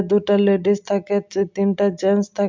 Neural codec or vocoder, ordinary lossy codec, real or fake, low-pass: none; MP3, 64 kbps; real; 7.2 kHz